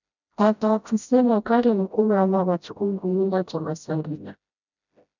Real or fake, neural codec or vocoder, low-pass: fake; codec, 16 kHz, 0.5 kbps, FreqCodec, smaller model; 7.2 kHz